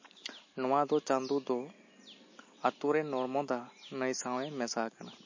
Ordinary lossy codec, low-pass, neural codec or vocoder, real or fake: MP3, 32 kbps; 7.2 kHz; none; real